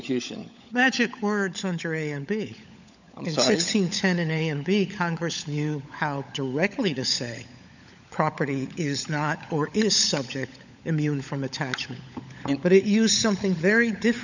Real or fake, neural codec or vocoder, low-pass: fake; codec, 16 kHz, 16 kbps, FunCodec, trained on LibriTTS, 50 frames a second; 7.2 kHz